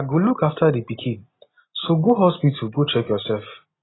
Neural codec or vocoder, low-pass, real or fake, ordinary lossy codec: none; 7.2 kHz; real; AAC, 16 kbps